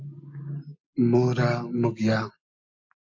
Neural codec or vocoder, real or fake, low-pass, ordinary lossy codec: none; real; 7.2 kHz; AAC, 48 kbps